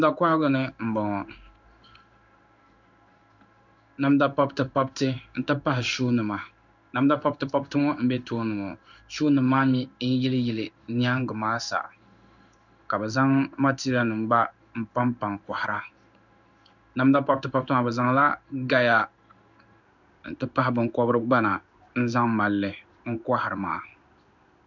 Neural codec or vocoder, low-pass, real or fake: codec, 16 kHz in and 24 kHz out, 1 kbps, XY-Tokenizer; 7.2 kHz; fake